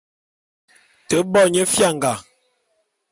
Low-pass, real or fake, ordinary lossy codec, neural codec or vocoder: 10.8 kHz; real; MP3, 96 kbps; none